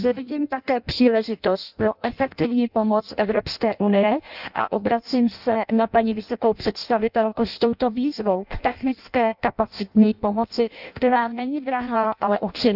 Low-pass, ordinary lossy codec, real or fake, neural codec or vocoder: 5.4 kHz; none; fake; codec, 16 kHz in and 24 kHz out, 0.6 kbps, FireRedTTS-2 codec